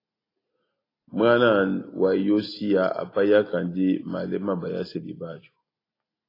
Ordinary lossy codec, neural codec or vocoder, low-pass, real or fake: AAC, 24 kbps; none; 5.4 kHz; real